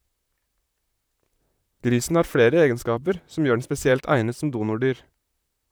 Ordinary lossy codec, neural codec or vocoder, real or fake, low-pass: none; vocoder, 44.1 kHz, 128 mel bands, Pupu-Vocoder; fake; none